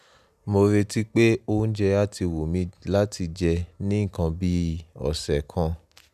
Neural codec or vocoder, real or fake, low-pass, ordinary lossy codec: none; real; 14.4 kHz; none